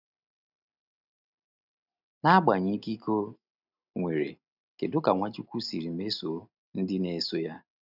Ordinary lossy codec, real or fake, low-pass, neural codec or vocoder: none; real; 5.4 kHz; none